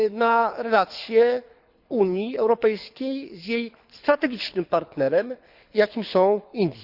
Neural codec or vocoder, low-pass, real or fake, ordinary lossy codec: codec, 24 kHz, 6 kbps, HILCodec; 5.4 kHz; fake; Opus, 64 kbps